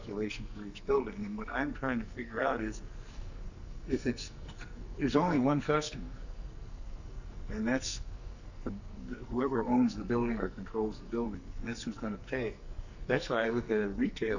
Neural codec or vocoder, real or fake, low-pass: codec, 44.1 kHz, 2.6 kbps, SNAC; fake; 7.2 kHz